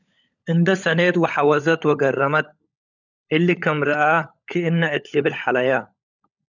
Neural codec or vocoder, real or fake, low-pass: codec, 16 kHz, 16 kbps, FunCodec, trained on LibriTTS, 50 frames a second; fake; 7.2 kHz